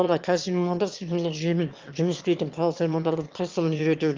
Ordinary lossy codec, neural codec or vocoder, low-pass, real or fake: Opus, 32 kbps; autoencoder, 22.05 kHz, a latent of 192 numbers a frame, VITS, trained on one speaker; 7.2 kHz; fake